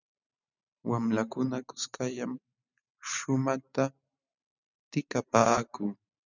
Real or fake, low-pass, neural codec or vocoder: fake; 7.2 kHz; vocoder, 44.1 kHz, 128 mel bands every 512 samples, BigVGAN v2